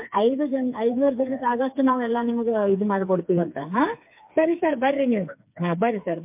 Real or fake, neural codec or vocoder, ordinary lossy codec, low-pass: fake; codec, 16 kHz, 4 kbps, FreqCodec, smaller model; MP3, 32 kbps; 3.6 kHz